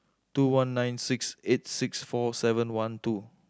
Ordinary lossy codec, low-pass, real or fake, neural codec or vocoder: none; none; real; none